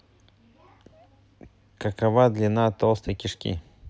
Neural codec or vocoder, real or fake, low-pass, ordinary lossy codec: none; real; none; none